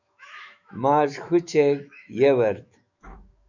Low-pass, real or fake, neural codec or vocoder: 7.2 kHz; fake; autoencoder, 48 kHz, 128 numbers a frame, DAC-VAE, trained on Japanese speech